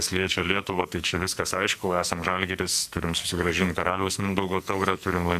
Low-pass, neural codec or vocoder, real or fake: 14.4 kHz; codec, 44.1 kHz, 2.6 kbps, SNAC; fake